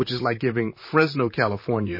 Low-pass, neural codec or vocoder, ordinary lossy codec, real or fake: 5.4 kHz; vocoder, 44.1 kHz, 128 mel bands, Pupu-Vocoder; MP3, 24 kbps; fake